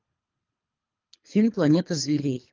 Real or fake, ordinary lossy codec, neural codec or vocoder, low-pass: fake; Opus, 24 kbps; codec, 24 kHz, 3 kbps, HILCodec; 7.2 kHz